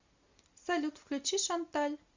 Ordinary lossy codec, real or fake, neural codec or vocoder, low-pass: Opus, 64 kbps; real; none; 7.2 kHz